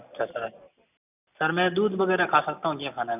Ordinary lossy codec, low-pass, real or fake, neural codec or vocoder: none; 3.6 kHz; real; none